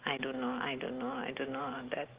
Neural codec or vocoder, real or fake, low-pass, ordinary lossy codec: none; real; 3.6 kHz; Opus, 16 kbps